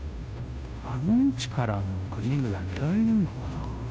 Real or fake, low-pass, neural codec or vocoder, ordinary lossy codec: fake; none; codec, 16 kHz, 0.5 kbps, FunCodec, trained on Chinese and English, 25 frames a second; none